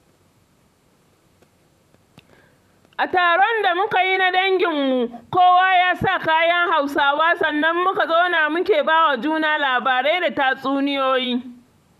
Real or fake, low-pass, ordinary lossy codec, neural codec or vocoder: fake; 14.4 kHz; none; vocoder, 44.1 kHz, 128 mel bands, Pupu-Vocoder